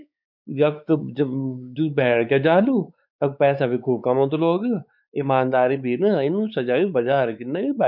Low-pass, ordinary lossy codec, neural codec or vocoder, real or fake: 5.4 kHz; none; codec, 16 kHz, 4 kbps, X-Codec, WavLM features, trained on Multilingual LibriSpeech; fake